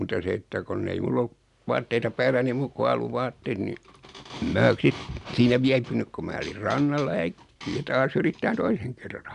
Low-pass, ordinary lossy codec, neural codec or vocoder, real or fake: 10.8 kHz; MP3, 96 kbps; none; real